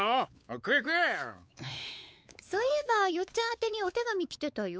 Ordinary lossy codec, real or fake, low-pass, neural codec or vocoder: none; fake; none; codec, 16 kHz, 4 kbps, X-Codec, WavLM features, trained on Multilingual LibriSpeech